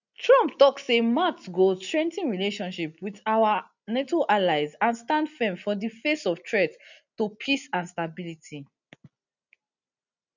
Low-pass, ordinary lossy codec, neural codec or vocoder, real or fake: 7.2 kHz; none; none; real